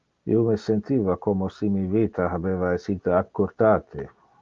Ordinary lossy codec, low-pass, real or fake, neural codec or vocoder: Opus, 32 kbps; 7.2 kHz; real; none